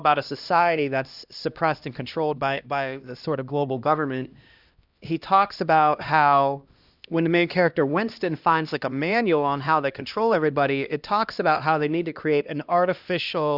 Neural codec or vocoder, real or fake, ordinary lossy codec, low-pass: codec, 16 kHz, 1 kbps, X-Codec, HuBERT features, trained on LibriSpeech; fake; Opus, 64 kbps; 5.4 kHz